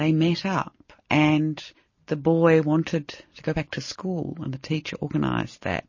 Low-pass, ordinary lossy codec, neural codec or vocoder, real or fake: 7.2 kHz; MP3, 32 kbps; none; real